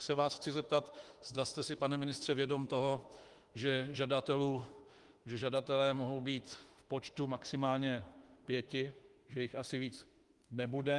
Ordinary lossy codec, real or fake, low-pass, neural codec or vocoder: Opus, 24 kbps; fake; 10.8 kHz; autoencoder, 48 kHz, 32 numbers a frame, DAC-VAE, trained on Japanese speech